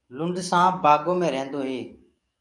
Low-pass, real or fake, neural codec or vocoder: 10.8 kHz; fake; codec, 44.1 kHz, 7.8 kbps, DAC